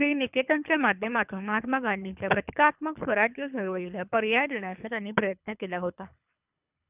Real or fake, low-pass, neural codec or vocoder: fake; 3.6 kHz; codec, 24 kHz, 3 kbps, HILCodec